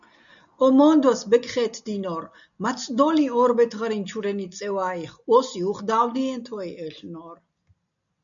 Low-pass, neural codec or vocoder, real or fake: 7.2 kHz; none; real